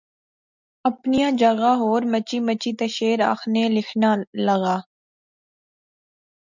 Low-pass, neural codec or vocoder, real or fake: 7.2 kHz; none; real